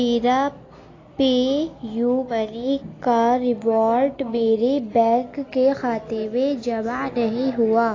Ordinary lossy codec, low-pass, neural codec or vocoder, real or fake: AAC, 32 kbps; 7.2 kHz; none; real